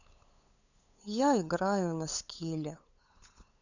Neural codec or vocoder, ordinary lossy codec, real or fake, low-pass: codec, 16 kHz, 8 kbps, FunCodec, trained on LibriTTS, 25 frames a second; none; fake; 7.2 kHz